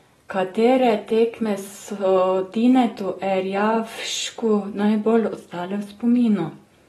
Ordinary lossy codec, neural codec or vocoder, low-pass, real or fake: AAC, 32 kbps; none; 19.8 kHz; real